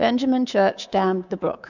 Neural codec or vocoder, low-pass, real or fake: codec, 24 kHz, 3.1 kbps, DualCodec; 7.2 kHz; fake